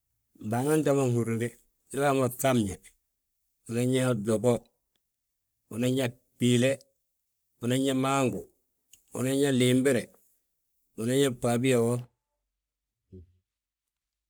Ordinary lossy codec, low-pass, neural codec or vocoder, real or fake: none; none; codec, 44.1 kHz, 3.4 kbps, Pupu-Codec; fake